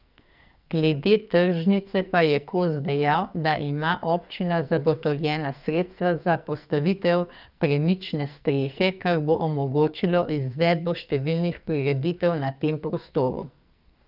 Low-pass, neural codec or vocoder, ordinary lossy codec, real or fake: 5.4 kHz; codec, 44.1 kHz, 2.6 kbps, SNAC; none; fake